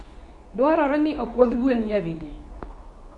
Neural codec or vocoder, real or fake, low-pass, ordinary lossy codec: codec, 24 kHz, 0.9 kbps, WavTokenizer, medium speech release version 2; fake; 10.8 kHz; none